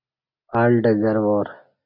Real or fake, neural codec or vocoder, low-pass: real; none; 5.4 kHz